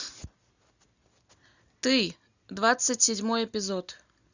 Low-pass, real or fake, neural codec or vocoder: 7.2 kHz; real; none